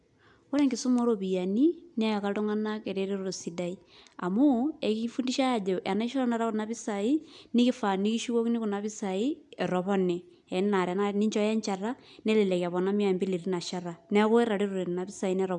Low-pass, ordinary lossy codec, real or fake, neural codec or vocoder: 10.8 kHz; none; real; none